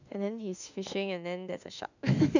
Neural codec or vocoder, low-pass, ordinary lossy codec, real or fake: autoencoder, 48 kHz, 32 numbers a frame, DAC-VAE, trained on Japanese speech; 7.2 kHz; none; fake